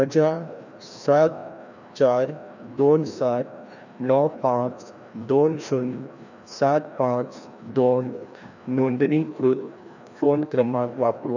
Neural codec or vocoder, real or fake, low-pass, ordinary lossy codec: codec, 16 kHz, 1 kbps, FreqCodec, larger model; fake; 7.2 kHz; none